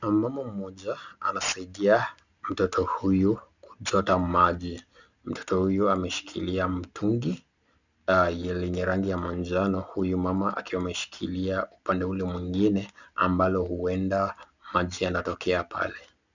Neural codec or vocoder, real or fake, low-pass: none; real; 7.2 kHz